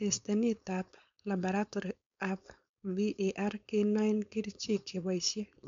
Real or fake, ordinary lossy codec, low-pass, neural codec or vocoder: fake; MP3, 64 kbps; 7.2 kHz; codec, 16 kHz, 4.8 kbps, FACodec